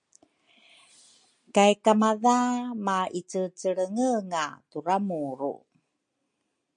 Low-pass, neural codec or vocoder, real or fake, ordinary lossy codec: 9.9 kHz; none; real; MP3, 48 kbps